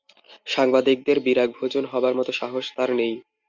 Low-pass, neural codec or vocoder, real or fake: 7.2 kHz; none; real